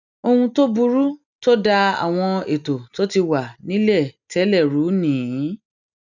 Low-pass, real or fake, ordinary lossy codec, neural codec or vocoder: 7.2 kHz; real; none; none